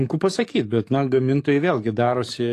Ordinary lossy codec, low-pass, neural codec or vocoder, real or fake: AAC, 48 kbps; 14.4 kHz; codec, 44.1 kHz, 7.8 kbps, DAC; fake